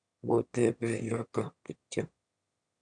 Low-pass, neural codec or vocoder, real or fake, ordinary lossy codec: 9.9 kHz; autoencoder, 22.05 kHz, a latent of 192 numbers a frame, VITS, trained on one speaker; fake; none